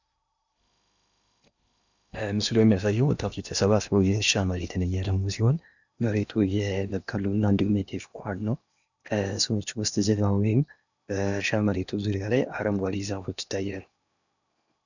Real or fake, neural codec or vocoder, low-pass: fake; codec, 16 kHz in and 24 kHz out, 0.8 kbps, FocalCodec, streaming, 65536 codes; 7.2 kHz